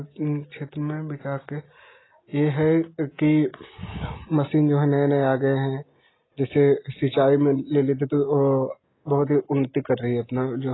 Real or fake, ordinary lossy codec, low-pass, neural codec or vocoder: real; AAC, 16 kbps; 7.2 kHz; none